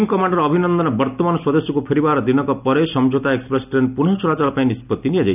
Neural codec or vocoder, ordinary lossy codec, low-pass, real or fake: none; none; 3.6 kHz; real